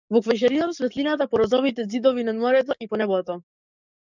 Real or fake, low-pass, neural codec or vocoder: fake; 7.2 kHz; codec, 44.1 kHz, 7.8 kbps, DAC